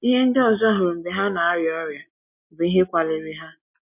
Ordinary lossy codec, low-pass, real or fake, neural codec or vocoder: MP3, 32 kbps; 3.6 kHz; real; none